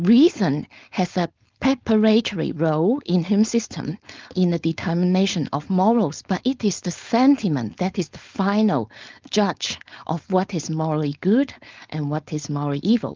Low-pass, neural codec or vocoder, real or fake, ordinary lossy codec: 7.2 kHz; codec, 16 kHz, 4.8 kbps, FACodec; fake; Opus, 32 kbps